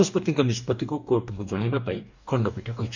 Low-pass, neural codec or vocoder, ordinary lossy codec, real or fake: 7.2 kHz; codec, 44.1 kHz, 2.6 kbps, DAC; none; fake